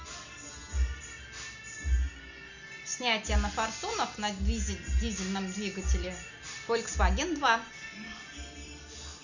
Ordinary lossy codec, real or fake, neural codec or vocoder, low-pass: none; real; none; 7.2 kHz